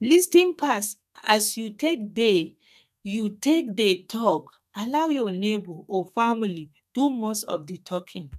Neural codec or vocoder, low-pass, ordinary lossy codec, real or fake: codec, 32 kHz, 1.9 kbps, SNAC; 14.4 kHz; none; fake